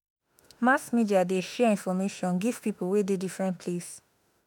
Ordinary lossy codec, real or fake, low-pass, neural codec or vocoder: none; fake; none; autoencoder, 48 kHz, 32 numbers a frame, DAC-VAE, trained on Japanese speech